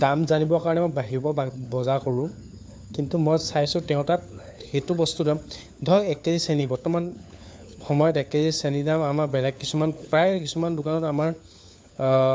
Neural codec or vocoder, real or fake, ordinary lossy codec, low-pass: codec, 16 kHz, 4 kbps, FunCodec, trained on LibriTTS, 50 frames a second; fake; none; none